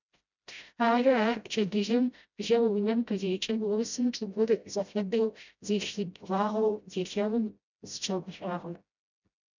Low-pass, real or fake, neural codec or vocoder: 7.2 kHz; fake; codec, 16 kHz, 0.5 kbps, FreqCodec, smaller model